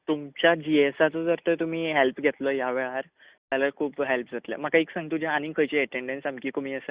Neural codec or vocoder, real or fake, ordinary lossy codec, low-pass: none; real; Opus, 64 kbps; 3.6 kHz